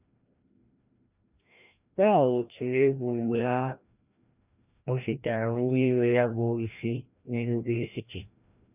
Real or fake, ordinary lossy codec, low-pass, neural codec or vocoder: fake; none; 3.6 kHz; codec, 16 kHz, 1 kbps, FreqCodec, larger model